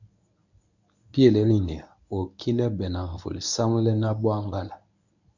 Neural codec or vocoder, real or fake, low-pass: codec, 24 kHz, 0.9 kbps, WavTokenizer, medium speech release version 1; fake; 7.2 kHz